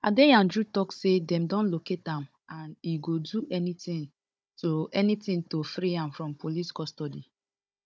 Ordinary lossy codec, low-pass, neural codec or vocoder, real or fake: none; none; codec, 16 kHz, 16 kbps, FunCodec, trained on Chinese and English, 50 frames a second; fake